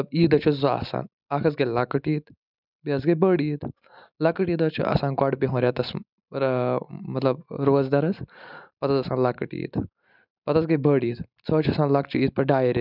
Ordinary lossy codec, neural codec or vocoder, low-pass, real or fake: none; none; 5.4 kHz; real